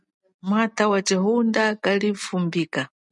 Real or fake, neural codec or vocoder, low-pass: real; none; 10.8 kHz